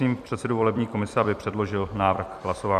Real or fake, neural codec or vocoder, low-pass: fake; vocoder, 44.1 kHz, 128 mel bands every 256 samples, BigVGAN v2; 14.4 kHz